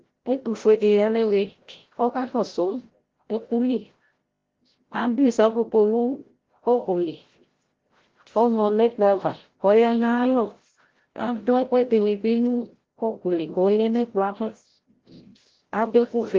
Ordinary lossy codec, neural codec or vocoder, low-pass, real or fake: Opus, 16 kbps; codec, 16 kHz, 0.5 kbps, FreqCodec, larger model; 7.2 kHz; fake